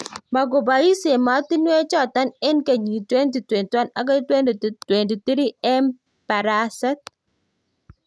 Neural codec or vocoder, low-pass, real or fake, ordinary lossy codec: none; none; real; none